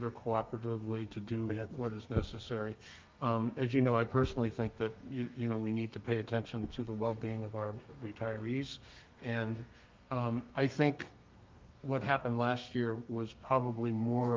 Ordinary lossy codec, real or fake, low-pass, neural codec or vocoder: Opus, 24 kbps; fake; 7.2 kHz; codec, 32 kHz, 1.9 kbps, SNAC